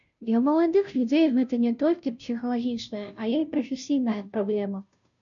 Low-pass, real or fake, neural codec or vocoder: 7.2 kHz; fake; codec, 16 kHz, 0.5 kbps, FunCodec, trained on Chinese and English, 25 frames a second